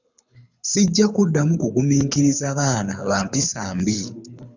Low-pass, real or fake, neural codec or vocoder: 7.2 kHz; fake; codec, 24 kHz, 6 kbps, HILCodec